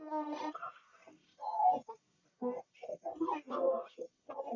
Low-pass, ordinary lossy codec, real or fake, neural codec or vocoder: 7.2 kHz; MP3, 64 kbps; fake; codec, 44.1 kHz, 1.7 kbps, Pupu-Codec